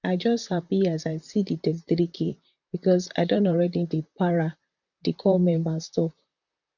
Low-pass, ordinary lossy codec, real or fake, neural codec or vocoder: 7.2 kHz; none; fake; vocoder, 44.1 kHz, 128 mel bands, Pupu-Vocoder